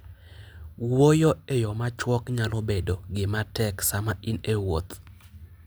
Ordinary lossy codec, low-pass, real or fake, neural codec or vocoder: none; none; real; none